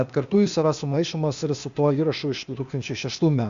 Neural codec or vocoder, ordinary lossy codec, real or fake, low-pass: codec, 16 kHz, 0.8 kbps, ZipCodec; Opus, 64 kbps; fake; 7.2 kHz